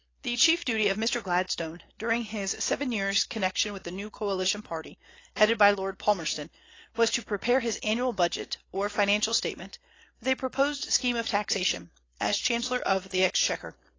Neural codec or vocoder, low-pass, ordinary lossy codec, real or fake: none; 7.2 kHz; AAC, 32 kbps; real